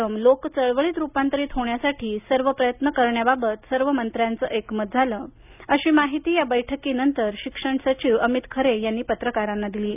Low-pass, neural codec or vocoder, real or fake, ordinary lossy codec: 3.6 kHz; none; real; none